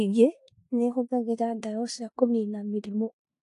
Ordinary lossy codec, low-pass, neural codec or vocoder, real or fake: AAC, 48 kbps; 10.8 kHz; codec, 16 kHz in and 24 kHz out, 0.9 kbps, LongCat-Audio-Codec, four codebook decoder; fake